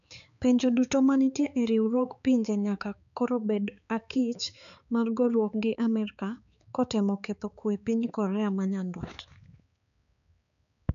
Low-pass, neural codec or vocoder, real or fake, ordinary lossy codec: 7.2 kHz; codec, 16 kHz, 4 kbps, X-Codec, HuBERT features, trained on balanced general audio; fake; none